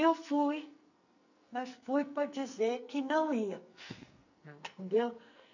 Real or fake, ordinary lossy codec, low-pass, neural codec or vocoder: fake; none; 7.2 kHz; codec, 44.1 kHz, 2.6 kbps, SNAC